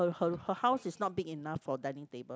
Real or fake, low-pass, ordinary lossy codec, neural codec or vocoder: real; none; none; none